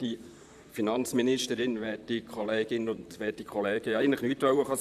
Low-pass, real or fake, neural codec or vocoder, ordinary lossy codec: 14.4 kHz; fake; vocoder, 44.1 kHz, 128 mel bands, Pupu-Vocoder; none